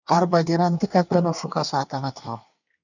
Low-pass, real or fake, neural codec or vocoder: 7.2 kHz; fake; codec, 24 kHz, 1 kbps, SNAC